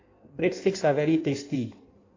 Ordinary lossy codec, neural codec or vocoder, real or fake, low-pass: AAC, 32 kbps; codec, 16 kHz in and 24 kHz out, 1.1 kbps, FireRedTTS-2 codec; fake; 7.2 kHz